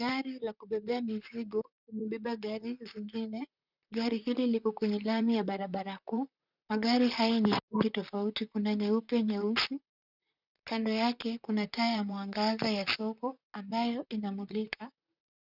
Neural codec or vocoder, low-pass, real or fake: vocoder, 44.1 kHz, 128 mel bands, Pupu-Vocoder; 5.4 kHz; fake